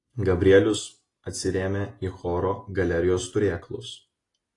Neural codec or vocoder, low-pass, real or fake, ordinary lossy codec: none; 10.8 kHz; real; AAC, 32 kbps